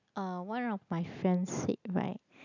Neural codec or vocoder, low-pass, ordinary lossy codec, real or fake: none; 7.2 kHz; none; real